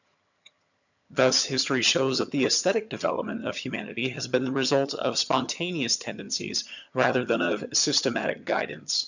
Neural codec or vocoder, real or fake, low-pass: vocoder, 22.05 kHz, 80 mel bands, HiFi-GAN; fake; 7.2 kHz